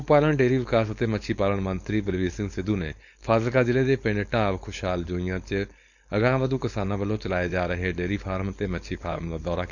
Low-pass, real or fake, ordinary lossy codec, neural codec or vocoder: 7.2 kHz; fake; none; codec, 16 kHz, 4.8 kbps, FACodec